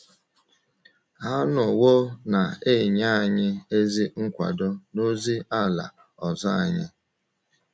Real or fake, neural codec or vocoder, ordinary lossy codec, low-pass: real; none; none; none